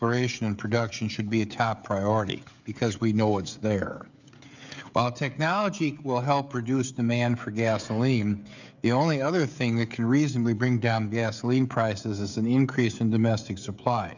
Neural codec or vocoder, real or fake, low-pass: codec, 16 kHz, 16 kbps, FreqCodec, smaller model; fake; 7.2 kHz